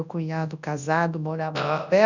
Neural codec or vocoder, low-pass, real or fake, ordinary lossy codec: codec, 24 kHz, 0.9 kbps, WavTokenizer, large speech release; 7.2 kHz; fake; none